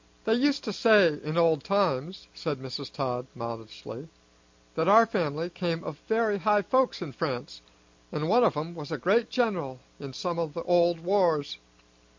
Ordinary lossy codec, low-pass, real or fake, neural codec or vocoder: MP3, 48 kbps; 7.2 kHz; real; none